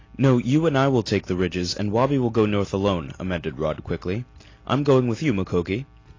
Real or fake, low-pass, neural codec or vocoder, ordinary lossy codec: real; 7.2 kHz; none; AAC, 32 kbps